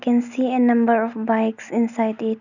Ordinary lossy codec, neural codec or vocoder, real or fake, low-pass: none; none; real; 7.2 kHz